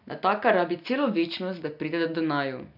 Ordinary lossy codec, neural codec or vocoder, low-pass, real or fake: none; none; 5.4 kHz; real